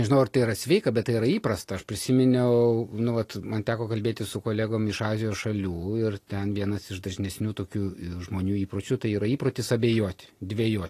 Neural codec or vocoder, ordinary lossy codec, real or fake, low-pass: none; AAC, 48 kbps; real; 14.4 kHz